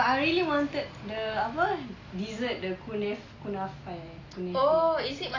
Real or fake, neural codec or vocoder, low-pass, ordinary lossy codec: real; none; 7.2 kHz; AAC, 32 kbps